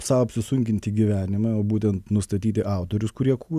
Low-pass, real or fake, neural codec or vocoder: 14.4 kHz; real; none